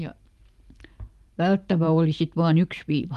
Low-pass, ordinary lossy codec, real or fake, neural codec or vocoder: 14.4 kHz; Opus, 32 kbps; fake; vocoder, 44.1 kHz, 128 mel bands every 512 samples, BigVGAN v2